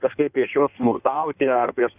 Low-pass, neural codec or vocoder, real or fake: 3.6 kHz; codec, 16 kHz in and 24 kHz out, 1.1 kbps, FireRedTTS-2 codec; fake